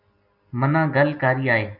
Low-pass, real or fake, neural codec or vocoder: 5.4 kHz; real; none